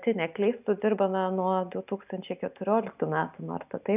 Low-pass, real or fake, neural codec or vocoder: 3.6 kHz; real; none